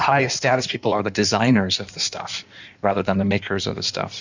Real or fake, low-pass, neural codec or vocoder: fake; 7.2 kHz; codec, 16 kHz in and 24 kHz out, 1.1 kbps, FireRedTTS-2 codec